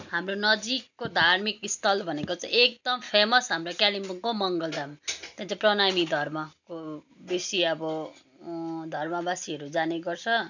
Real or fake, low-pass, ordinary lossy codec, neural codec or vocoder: real; 7.2 kHz; none; none